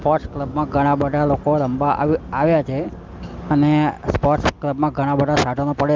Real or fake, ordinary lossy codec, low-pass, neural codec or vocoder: real; Opus, 24 kbps; 7.2 kHz; none